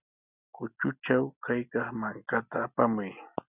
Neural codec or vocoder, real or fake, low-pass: none; real; 3.6 kHz